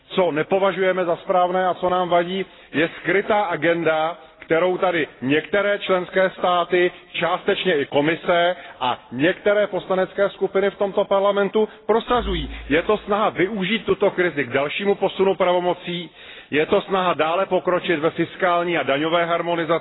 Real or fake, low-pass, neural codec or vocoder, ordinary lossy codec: real; 7.2 kHz; none; AAC, 16 kbps